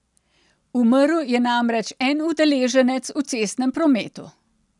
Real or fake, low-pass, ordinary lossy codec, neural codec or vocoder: real; 10.8 kHz; none; none